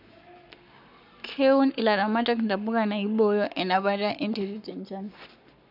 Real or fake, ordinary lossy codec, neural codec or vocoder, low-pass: fake; none; codec, 44.1 kHz, 7.8 kbps, Pupu-Codec; 5.4 kHz